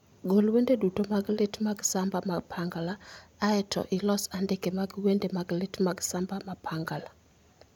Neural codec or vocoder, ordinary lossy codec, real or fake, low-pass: none; none; real; 19.8 kHz